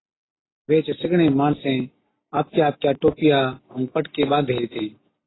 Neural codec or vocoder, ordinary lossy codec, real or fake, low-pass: none; AAC, 16 kbps; real; 7.2 kHz